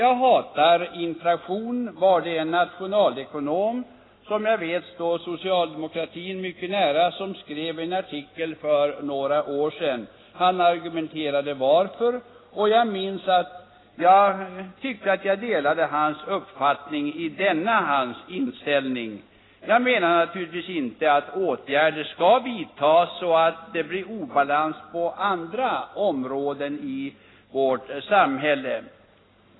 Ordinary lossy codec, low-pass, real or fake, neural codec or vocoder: AAC, 16 kbps; 7.2 kHz; real; none